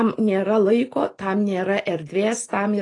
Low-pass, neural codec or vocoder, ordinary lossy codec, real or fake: 10.8 kHz; none; AAC, 32 kbps; real